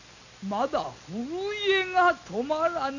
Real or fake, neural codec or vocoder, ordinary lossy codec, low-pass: real; none; none; 7.2 kHz